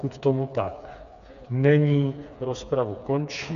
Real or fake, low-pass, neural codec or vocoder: fake; 7.2 kHz; codec, 16 kHz, 4 kbps, FreqCodec, smaller model